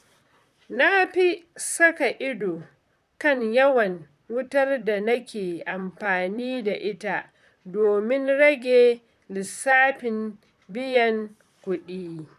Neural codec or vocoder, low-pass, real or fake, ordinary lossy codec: vocoder, 44.1 kHz, 128 mel bands, Pupu-Vocoder; 14.4 kHz; fake; none